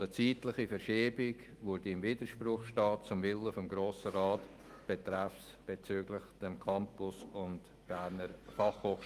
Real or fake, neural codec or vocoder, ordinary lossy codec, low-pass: fake; autoencoder, 48 kHz, 128 numbers a frame, DAC-VAE, trained on Japanese speech; Opus, 24 kbps; 14.4 kHz